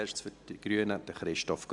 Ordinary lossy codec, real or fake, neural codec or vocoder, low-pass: MP3, 96 kbps; real; none; 10.8 kHz